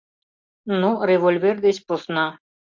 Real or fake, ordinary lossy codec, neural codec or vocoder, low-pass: real; MP3, 64 kbps; none; 7.2 kHz